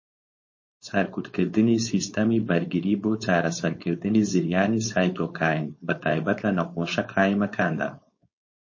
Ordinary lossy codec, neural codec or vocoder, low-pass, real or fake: MP3, 32 kbps; codec, 16 kHz, 4.8 kbps, FACodec; 7.2 kHz; fake